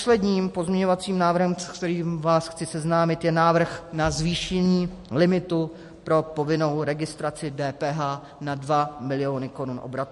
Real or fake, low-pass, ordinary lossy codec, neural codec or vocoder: real; 14.4 kHz; MP3, 48 kbps; none